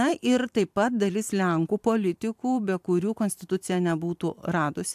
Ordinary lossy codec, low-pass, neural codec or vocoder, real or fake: AAC, 96 kbps; 14.4 kHz; vocoder, 44.1 kHz, 128 mel bands every 512 samples, BigVGAN v2; fake